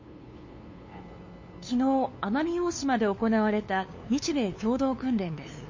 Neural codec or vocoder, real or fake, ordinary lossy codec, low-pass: codec, 16 kHz, 2 kbps, FunCodec, trained on LibriTTS, 25 frames a second; fake; MP3, 32 kbps; 7.2 kHz